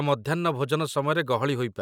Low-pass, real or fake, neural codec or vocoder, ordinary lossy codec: 19.8 kHz; real; none; none